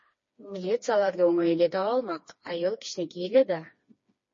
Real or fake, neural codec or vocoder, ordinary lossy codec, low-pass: fake; codec, 16 kHz, 2 kbps, FreqCodec, smaller model; MP3, 32 kbps; 7.2 kHz